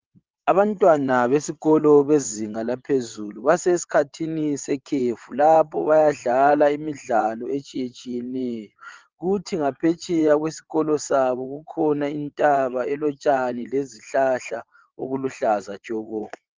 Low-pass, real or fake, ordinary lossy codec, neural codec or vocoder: 7.2 kHz; fake; Opus, 32 kbps; vocoder, 22.05 kHz, 80 mel bands, WaveNeXt